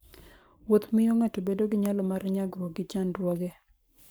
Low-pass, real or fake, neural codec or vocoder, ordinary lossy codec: none; fake; codec, 44.1 kHz, 7.8 kbps, Pupu-Codec; none